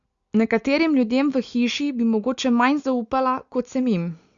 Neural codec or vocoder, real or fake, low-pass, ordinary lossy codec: none; real; 7.2 kHz; Opus, 64 kbps